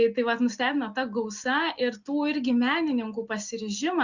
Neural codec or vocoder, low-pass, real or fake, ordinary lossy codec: none; 7.2 kHz; real; Opus, 64 kbps